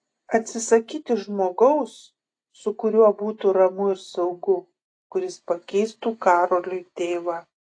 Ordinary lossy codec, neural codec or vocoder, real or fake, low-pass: AAC, 48 kbps; none; real; 9.9 kHz